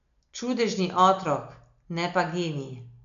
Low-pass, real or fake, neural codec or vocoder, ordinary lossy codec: 7.2 kHz; real; none; none